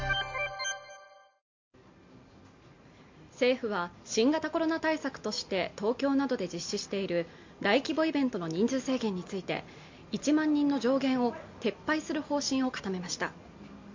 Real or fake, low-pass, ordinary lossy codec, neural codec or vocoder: real; 7.2 kHz; MP3, 48 kbps; none